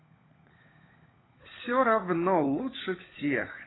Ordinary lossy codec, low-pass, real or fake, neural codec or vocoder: AAC, 16 kbps; 7.2 kHz; fake; codec, 16 kHz, 16 kbps, FunCodec, trained on LibriTTS, 50 frames a second